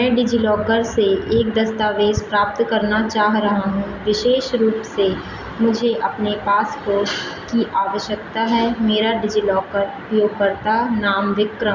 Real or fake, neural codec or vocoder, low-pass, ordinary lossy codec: real; none; 7.2 kHz; Opus, 64 kbps